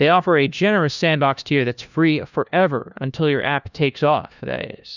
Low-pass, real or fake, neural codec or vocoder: 7.2 kHz; fake; codec, 16 kHz, 1 kbps, FunCodec, trained on LibriTTS, 50 frames a second